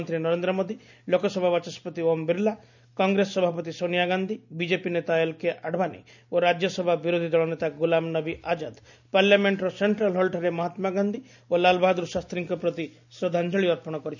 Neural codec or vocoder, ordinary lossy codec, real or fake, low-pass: none; none; real; 7.2 kHz